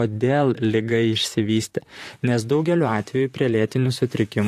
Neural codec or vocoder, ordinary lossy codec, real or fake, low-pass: vocoder, 44.1 kHz, 128 mel bands, Pupu-Vocoder; AAC, 64 kbps; fake; 14.4 kHz